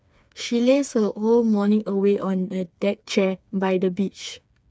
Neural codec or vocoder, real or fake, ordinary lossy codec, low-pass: codec, 16 kHz, 4 kbps, FreqCodec, smaller model; fake; none; none